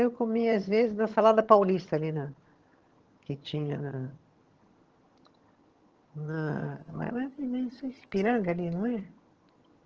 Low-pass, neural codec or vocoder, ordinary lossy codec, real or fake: 7.2 kHz; vocoder, 22.05 kHz, 80 mel bands, HiFi-GAN; Opus, 16 kbps; fake